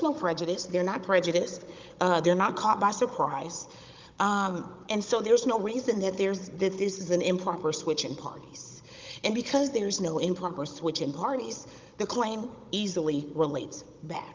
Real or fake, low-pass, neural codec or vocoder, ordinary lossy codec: fake; 7.2 kHz; codec, 16 kHz, 16 kbps, FunCodec, trained on Chinese and English, 50 frames a second; Opus, 24 kbps